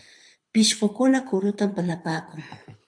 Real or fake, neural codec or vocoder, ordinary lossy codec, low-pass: fake; codec, 24 kHz, 6 kbps, HILCodec; MP3, 64 kbps; 9.9 kHz